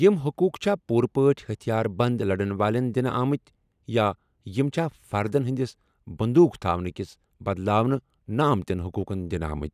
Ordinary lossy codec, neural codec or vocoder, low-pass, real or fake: none; none; 14.4 kHz; real